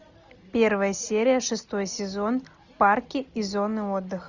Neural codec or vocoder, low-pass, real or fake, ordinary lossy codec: none; 7.2 kHz; real; Opus, 64 kbps